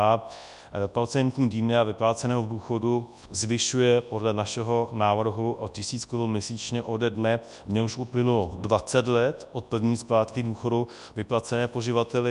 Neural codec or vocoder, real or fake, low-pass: codec, 24 kHz, 0.9 kbps, WavTokenizer, large speech release; fake; 10.8 kHz